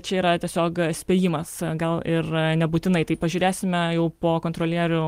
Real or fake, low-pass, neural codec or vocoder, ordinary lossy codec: real; 14.4 kHz; none; Opus, 24 kbps